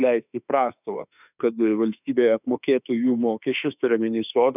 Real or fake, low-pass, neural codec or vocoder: fake; 3.6 kHz; autoencoder, 48 kHz, 32 numbers a frame, DAC-VAE, trained on Japanese speech